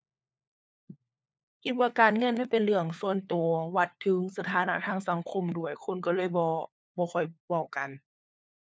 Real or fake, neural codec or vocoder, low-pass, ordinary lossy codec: fake; codec, 16 kHz, 4 kbps, FunCodec, trained on LibriTTS, 50 frames a second; none; none